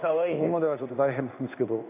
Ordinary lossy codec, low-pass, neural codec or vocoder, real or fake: none; 3.6 kHz; codec, 16 kHz in and 24 kHz out, 1 kbps, XY-Tokenizer; fake